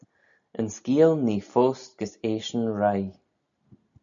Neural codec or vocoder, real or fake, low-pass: none; real; 7.2 kHz